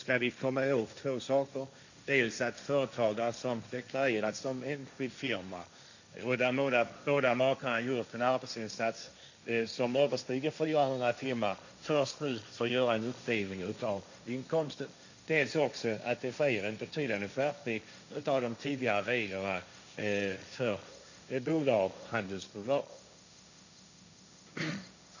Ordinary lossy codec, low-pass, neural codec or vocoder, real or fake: none; 7.2 kHz; codec, 16 kHz, 1.1 kbps, Voila-Tokenizer; fake